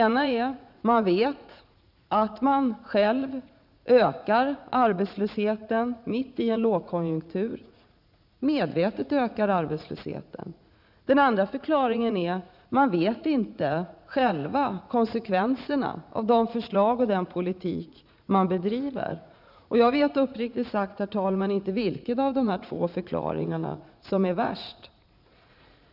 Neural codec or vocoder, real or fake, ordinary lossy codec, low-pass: vocoder, 44.1 kHz, 80 mel bands, Vocos; fake; none; 5.4 kHz